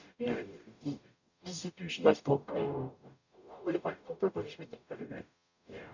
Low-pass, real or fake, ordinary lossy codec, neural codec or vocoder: 7.2 kHz; fake; none; codec, 44.1 kHz, 0.9 kbps, DAC